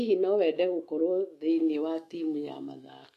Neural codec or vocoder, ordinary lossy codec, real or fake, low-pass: autoencoder, 48 kHz, 128 numbers a frame, DAC-VAE, trained on Japanese speech; AAC, 48 kbps; fake; 14.4 kHz